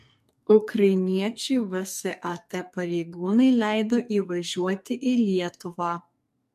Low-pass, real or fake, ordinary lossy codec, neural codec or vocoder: 14.4 kHz; fake; MP3, 64 kbps; codec, 44.1 kHz, 2.6 kbps, SNAC